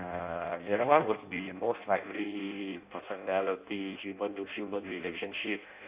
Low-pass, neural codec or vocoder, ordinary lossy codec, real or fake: 3.6 kHz; codec, 16 kHz in and 24 kHz out, 0.6 kbps, FireRedTTS-2 codec; Opus, 64 kbps; fake